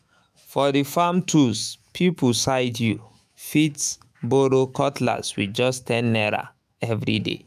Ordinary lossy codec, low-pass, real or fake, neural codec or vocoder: none; 14.4 kHz; fake; autoencoder, 48 kHz, 128 numbers a frame, DAC-VAE, trained on Japanese speech